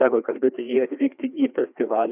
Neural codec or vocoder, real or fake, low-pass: codec, 16 kHz, 2 kbps, FreqCodec, larger model; fake; 3.6 kHz